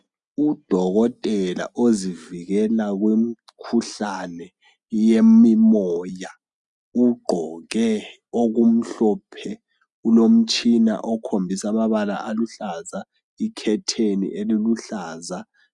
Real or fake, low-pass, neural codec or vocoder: real; 10.8 kHz; none